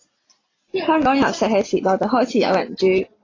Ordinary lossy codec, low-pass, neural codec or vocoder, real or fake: AAC, 32 kbps; 7.2 kHz; none; real